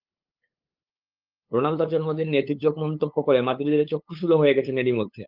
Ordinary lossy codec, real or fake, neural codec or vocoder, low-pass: Opus, 24 kbps; fake; codec, 16 kHz, 8 kbps, FunCodec, trained on LibriTTS, 25 frames a second; 5.4 kHz